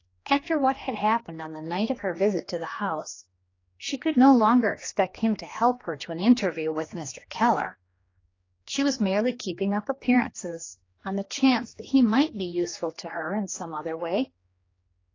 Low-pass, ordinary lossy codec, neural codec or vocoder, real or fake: 7.2 kHz; AAC, 32 kbps; codec, 16 kHz, 2 kbps, X-Codec, HuBERT features, trained on general audio; fake